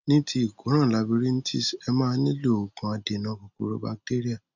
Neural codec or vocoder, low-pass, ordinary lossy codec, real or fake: none; 7.2 kHz; none; real